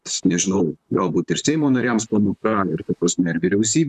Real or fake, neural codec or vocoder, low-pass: fake; vocoder, 44.1 kHz, 128 mel bands, Pupu-Vocoder; 14.4 kHz